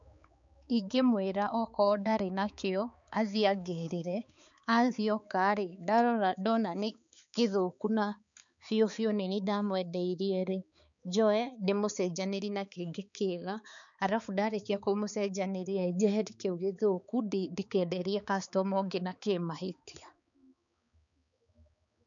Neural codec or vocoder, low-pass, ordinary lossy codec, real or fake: codec, 16 kHz, 4 kbps, X-Codec, HuBERT features, trained on balanced general audio; 7.2 kHz; none; fake